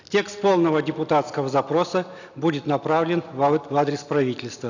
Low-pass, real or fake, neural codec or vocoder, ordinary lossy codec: 7.2 kHz; real; none; none